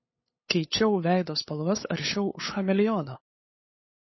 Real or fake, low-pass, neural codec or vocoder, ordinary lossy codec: fake; 7.2 kHz; codec, 16 kHz, 8 kbps, FunCodec, trained on LibriTTS, 25 frames a second; MP3, 24 kbps